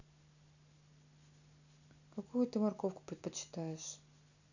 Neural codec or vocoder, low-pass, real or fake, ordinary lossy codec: none; 7.2 kHz; real; none